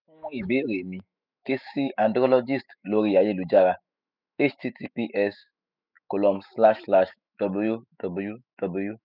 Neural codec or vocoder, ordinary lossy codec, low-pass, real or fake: autoencoder, 48 kHz, 128 numbers a frame, DAC-VAE, trained on Japanese speech; none; 5.4 kHz; fake